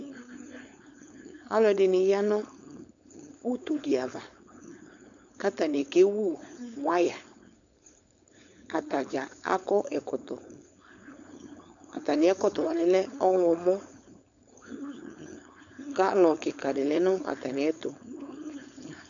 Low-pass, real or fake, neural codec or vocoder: 7.2 kHz; fake; codec, 16 kHz, 4.8 kbps, FACodec